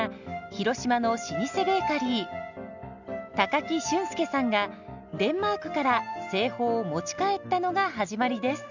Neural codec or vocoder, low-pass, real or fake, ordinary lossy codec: none; 7.2 kHz; real; none